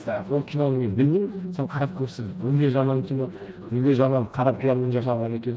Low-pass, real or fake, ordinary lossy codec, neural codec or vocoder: none; fake; none; codec, 16 kHz, 1 kbps, FreqCodec, smaller model